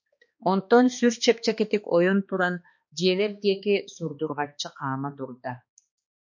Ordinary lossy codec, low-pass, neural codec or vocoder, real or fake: MP3, 48 kbps; 7.2 kHz; codec, 16 kHz, 2 kbps, X-Codec, HuBERT features, trained on balanced general audio; fake